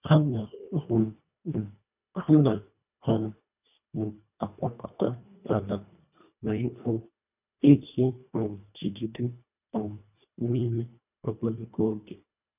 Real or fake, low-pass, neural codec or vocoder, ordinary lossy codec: fake; 3.6 kHz; codec, 24 kHz, 1.5 kbps, HILCodec; none